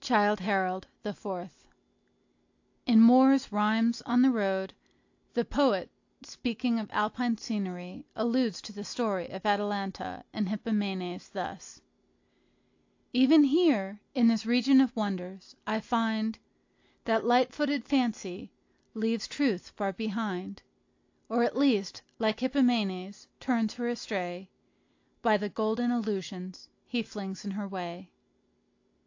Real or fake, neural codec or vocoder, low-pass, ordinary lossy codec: real; none; 7.2 kHz; AAC, 48 kbps